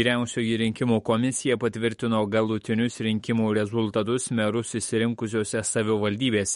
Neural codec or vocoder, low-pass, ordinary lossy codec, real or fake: autoencoder, 48 kHz, 128 numbers a frame, DAC-VAE, trained on Japanese speech; 19.8 kHz; MP3, 48 kbps; fake